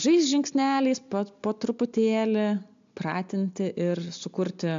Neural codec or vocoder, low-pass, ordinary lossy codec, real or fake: none; 7.2 kHz; AAC, 64 kbps; real